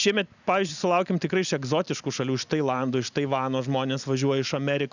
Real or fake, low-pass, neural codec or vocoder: real; 7.2 kHz; none